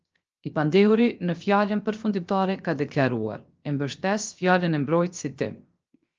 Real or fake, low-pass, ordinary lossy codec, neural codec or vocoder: fake; 7.2 kHz; Opus, 24 kbps; codec, 16 kHz, 0.7 kbps, FocalCodec